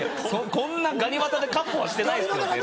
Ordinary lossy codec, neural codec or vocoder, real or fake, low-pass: none; none; real; none